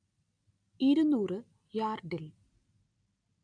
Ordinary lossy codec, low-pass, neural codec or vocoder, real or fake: none; 9.9 kHz; none; real